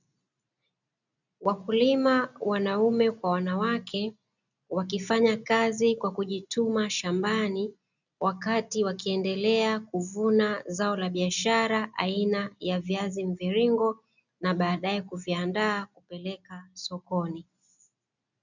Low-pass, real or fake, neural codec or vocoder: 7.2 kHz; real; none